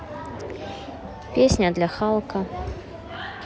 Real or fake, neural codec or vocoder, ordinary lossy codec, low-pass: real; none; none; none